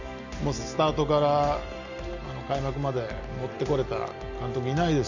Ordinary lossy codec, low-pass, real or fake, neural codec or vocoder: none; 7.2 kHz; real; none